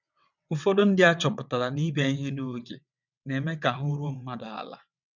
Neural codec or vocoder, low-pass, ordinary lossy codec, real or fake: vocoder, 22.05 kHz, 80 mel bands, WaveNeXt; 7.2 kHz; none; fake